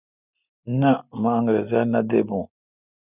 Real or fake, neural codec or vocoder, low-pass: real; none; 3.6 kHz